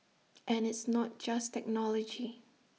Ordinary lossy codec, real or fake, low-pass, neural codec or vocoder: none; real; none; none